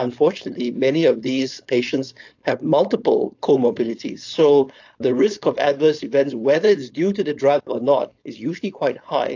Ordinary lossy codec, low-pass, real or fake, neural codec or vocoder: AAC, 48 kbps; 7.2 kHz; fake; codec, 16 kHz, 4.8 kbps, FACodec